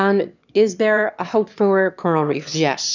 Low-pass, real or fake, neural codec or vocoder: 7.2 kHz; fake; autoencoder, 22.05 kHz, a latent of 192 numbers a frame, VITS, trained on one speaker